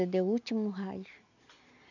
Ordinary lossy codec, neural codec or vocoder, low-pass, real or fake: none; none; 7.2 kHz; real